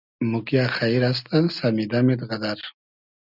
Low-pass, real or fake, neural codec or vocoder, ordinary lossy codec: 5.4 kHz; real; none; Opus, 64 kbps